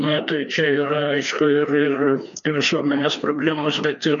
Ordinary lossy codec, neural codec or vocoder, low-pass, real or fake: MP3, 64 kbps; codec, 16 kHz, 2 kbps, FreqCodec, larger model; 7.2 kHz; fake